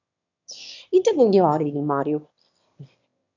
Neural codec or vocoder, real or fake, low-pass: autoencoder, 22.05 kHz, a latent of 192 numbers a frame, VITS, trained on one speaker; fake; 7.2 kHz